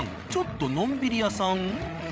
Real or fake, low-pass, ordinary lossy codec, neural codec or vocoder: fake; none; none; codec, 16 kHz, 16 kbps, FreqCodec, larger model